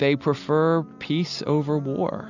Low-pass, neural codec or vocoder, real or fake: 7.2 kHz; none; real